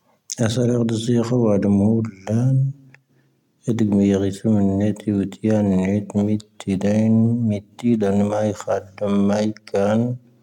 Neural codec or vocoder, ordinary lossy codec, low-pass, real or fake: none; none; 19.8 kHz; real